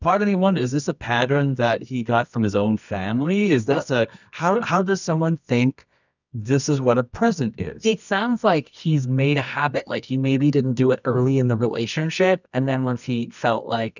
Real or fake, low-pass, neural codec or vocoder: fake; 7.2 kHz; codec, 24 kHz, 0.9 kbps, WavTokenizer, medium music audio release